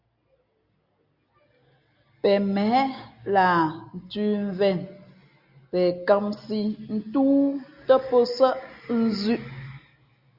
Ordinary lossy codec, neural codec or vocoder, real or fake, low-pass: Opus, 64 kbps; none; real; 5.4 kHz